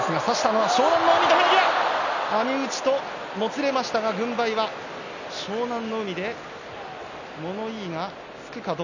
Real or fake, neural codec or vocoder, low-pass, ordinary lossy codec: real; none; 7.2 kHz; none